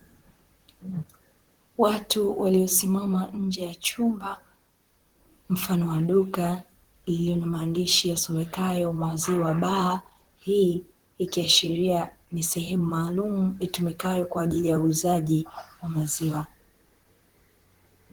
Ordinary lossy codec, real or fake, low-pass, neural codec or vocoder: Opus, 16 kbps; fake; 19.8 kHz; vocoder, 44.1 kHz, 128 mel bands, Pupu-Vocoder